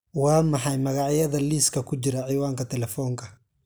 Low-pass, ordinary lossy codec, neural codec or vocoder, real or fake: none; none; none; real